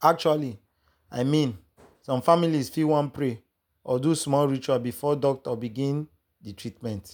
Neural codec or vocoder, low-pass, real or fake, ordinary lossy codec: none; none; real; none